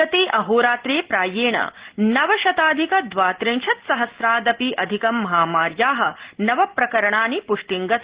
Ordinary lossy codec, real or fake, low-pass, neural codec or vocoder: Opus, 16 kbps; real; 3.6 kHz; none